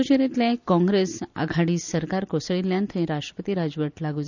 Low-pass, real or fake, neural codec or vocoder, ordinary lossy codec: 7.2 kHz; real; none; none